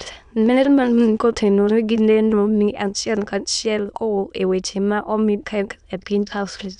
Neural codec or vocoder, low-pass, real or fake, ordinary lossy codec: autoencoder, 22.05 kHz, a latent of 192 numbers a frame, VITS, trained on many speakers; 9.9 kHz; fake; none